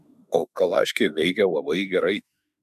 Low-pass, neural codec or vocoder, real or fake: 14.4 kHz; autoencoder, 48 kHz, 32 numbers a frame, DAC-VAE, trained on Japanese speech; fake